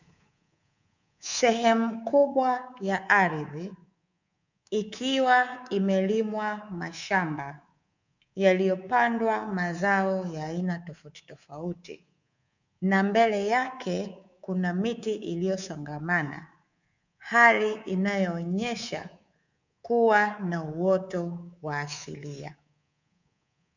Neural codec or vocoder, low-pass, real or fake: codec, 24 kHz, 3.1 kbps, DualCodec; 7.2 kHz; fake